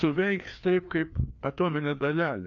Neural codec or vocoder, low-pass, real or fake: codec, 16 kHz, 2 kbps, FreqCodec, larger model; 7.2 kHz; fake